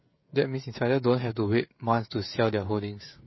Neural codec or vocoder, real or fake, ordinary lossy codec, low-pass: codec, 16 kHz, 16 kbps, FreqCodec, smaller model; fake; MP3, 24 kbps; 7.2 kHz